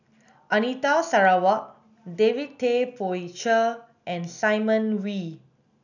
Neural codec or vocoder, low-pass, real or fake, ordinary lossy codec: none; 7.2 kHz; real; none